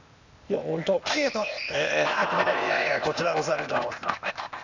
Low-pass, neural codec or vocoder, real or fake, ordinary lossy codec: 7.2 kHz; codec, 16 kHz, 0.8 kbps, ZipCodec; fake; none